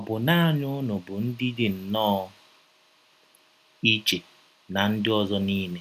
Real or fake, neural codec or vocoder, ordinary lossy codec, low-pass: real; none; none; 14.4 kHz